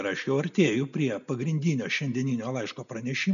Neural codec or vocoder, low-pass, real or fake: none; 7.2 kHz; real